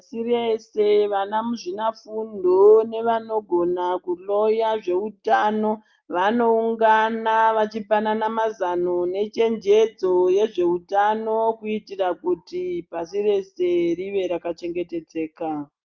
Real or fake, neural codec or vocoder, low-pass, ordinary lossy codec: real; none; 7.2 kHz; Opus, 32 kbps